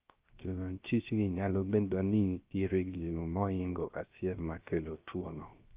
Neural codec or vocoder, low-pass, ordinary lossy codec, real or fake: codec, 16 kHz, 0.3 kbps, FocalCodec; 3.6 kHz; Opus, 32 kbps; fake